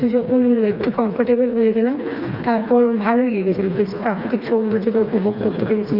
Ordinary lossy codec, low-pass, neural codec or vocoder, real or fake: Opus, 64 kbps; 5.4 kHz; codec, 16 kHz, 2 kbps, FreqCodec, smaller model; fake